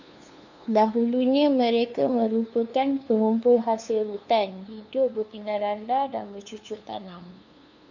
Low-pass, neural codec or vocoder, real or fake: 7.2 kHz; codec, 16 kHz, 2 kbps, FunCodec, trained on LibriTTS, 25 frames a second; fake